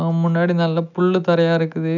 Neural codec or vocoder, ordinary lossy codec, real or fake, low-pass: none; none; real; 7.2 kHz